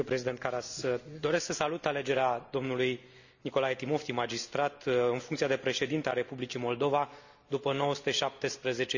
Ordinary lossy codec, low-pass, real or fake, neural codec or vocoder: none; 7.2 kHz; real; none